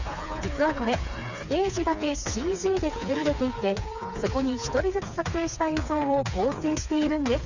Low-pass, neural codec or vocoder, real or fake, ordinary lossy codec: 7.2 kHz; codec, 16 kHz, 4 kbps, FreqCodec, smaller model; fake; none